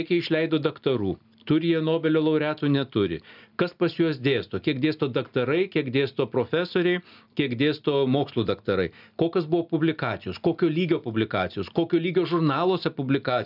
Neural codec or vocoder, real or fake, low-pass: none; real; 5.4 kHz